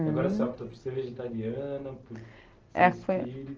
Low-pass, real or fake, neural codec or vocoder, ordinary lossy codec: 7.2 kHz; real; none; Opus, 16 kbps